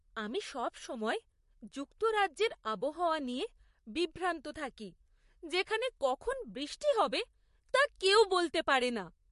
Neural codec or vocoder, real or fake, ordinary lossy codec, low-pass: vocoder, 44.1 kHz, 128 mel bands, Pupu-Vocoder; fake; MP3, 48 kbps; 14.4 kHz